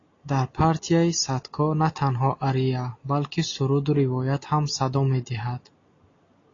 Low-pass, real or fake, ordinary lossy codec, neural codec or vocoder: 7.2 kHz; real; AAC, 32 kbps; none